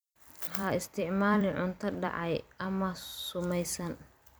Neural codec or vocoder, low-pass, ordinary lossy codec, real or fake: vocoder, 44.1 kHz, 128 mel bands every 256 samples, BigVGAN v2; none; none; fake